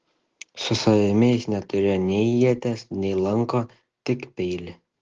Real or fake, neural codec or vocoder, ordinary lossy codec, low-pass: real; none; Opus, 16 kbps; 7.2 kHz